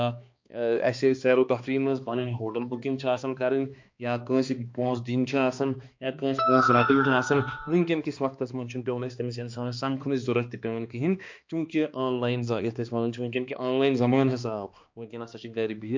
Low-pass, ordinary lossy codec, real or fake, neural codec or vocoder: 7.2 kHz; MP3, 48 kbps; fake; codec, 16 kHz, 2 kbps, X-Codec, HuBERT features, trained on balanced general audio